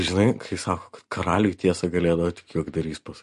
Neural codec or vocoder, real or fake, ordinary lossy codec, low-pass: none; real; MP3, 48 kbps; 14.4 kHz